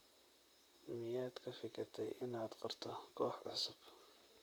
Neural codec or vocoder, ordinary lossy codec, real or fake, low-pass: vocoder, 44.1 kHz, 128 mel bands, Pupu-Vocoder; none; fake; none